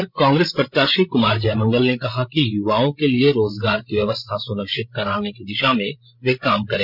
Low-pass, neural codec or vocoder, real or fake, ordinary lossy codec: 5.4 kHz; codec, 16 kHz, 16 kbps, FreqCodec, larger model; fake; none